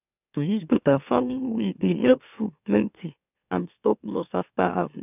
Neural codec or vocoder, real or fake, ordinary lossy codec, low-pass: autoencoder, 44.1 kHz, a latent of 192 numbers a frame, MeloTTS; fake; none; 3.6 kHz